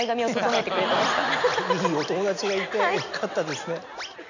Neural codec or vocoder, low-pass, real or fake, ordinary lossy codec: none; 7.2 kHz; real; none